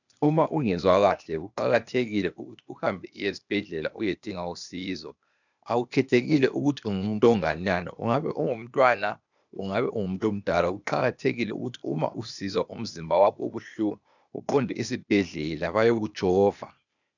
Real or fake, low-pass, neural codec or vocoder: fake; 7.2 kHz; codec, 16 kHz, 0.8 kbps, ZipCodec